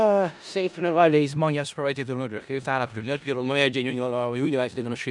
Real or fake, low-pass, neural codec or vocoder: fake; 10.8 kHz; codec, 16 kHz in and 24 kHz out, 0.4 kbps, LongCat-Audio-Codec, four codebook decoder